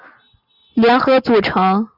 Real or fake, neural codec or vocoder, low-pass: real; none; 5.4 kHz